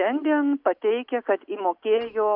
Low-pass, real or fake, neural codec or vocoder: 5.4 kHz; real; none